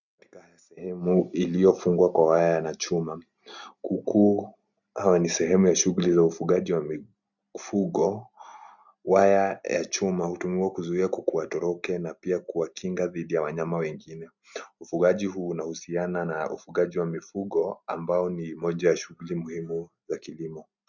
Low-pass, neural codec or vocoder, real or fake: 7.2 kHz; none; real